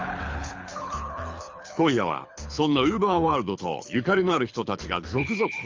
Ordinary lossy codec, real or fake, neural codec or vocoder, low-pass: Opus, 32 kbps; fake; codec, 24 kHz, 6 kbps, HILCodec; 7.2 kHz